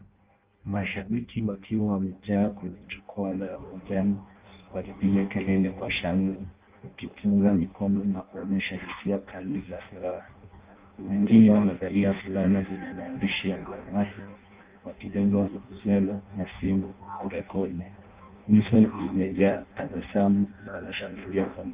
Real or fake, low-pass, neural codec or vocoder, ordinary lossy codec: fake; 3.6 kHz; codec, 16 kHz in and 24 kHz out, 0.6 kbps, FireRedTTS-2 codec; Opus, 24 kbps